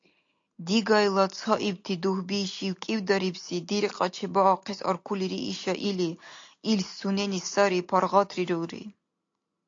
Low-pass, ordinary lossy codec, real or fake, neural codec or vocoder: 7.2 kHz; AAC, 48 kbps; real; none